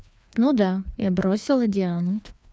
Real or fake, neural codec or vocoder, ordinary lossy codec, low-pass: fake; codec, 16 kHz, 2 kbps, FreqCodec, larger model; none; none